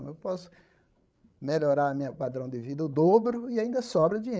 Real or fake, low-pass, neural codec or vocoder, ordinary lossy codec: fake; none; codec, 16 kHz, 16 kbps, FreqCodec, larger model; none